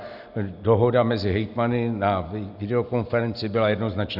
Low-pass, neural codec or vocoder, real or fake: 5.4 kHz; none; real